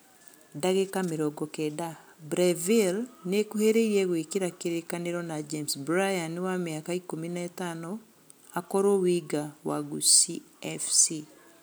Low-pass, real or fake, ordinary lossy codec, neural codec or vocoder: none; real; none; none